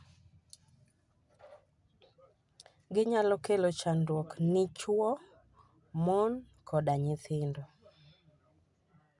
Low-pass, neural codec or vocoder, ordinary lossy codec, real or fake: 10.8 kHz; none; none; real